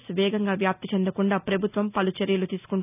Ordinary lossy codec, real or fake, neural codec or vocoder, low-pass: none; real; none; 3.6 kHz